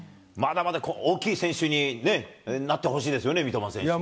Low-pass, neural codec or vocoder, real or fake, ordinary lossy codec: none; none; real; none